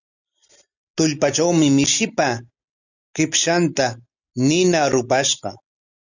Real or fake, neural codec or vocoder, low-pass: real; none; 7.2 kHz